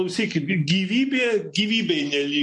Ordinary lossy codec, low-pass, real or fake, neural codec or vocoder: MP3, 48 kbps; 10.8 kHz; real; none